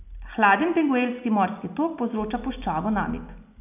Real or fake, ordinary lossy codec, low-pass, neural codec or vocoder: real; none; 3.6 kHz; none